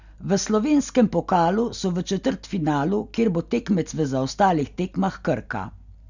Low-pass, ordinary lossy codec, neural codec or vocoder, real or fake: 7.2 kHz; none; none; real